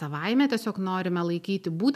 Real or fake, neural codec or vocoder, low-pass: real; none; 14.4 kHz